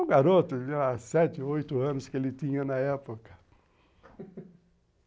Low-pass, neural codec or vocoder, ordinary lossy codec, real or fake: none; none; none; real